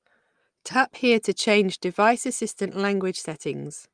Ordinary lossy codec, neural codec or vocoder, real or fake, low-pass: Opus, 32 kbps; none; real; 9.9 kHz